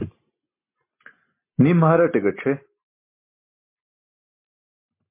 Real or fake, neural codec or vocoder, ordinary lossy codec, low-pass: real; none; MP3, 24 kbps; 3.6 kHz